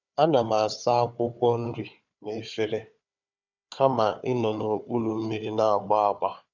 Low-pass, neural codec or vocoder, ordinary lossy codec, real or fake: 7.2 kHz; codec, 16 kHz, 4 kbps, FunCodec, trained on Chinese and English, 50 frames a second; none; fake